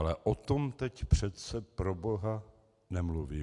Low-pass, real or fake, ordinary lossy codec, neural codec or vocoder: 10.8 kHz; real; AAC, 64 kbps; none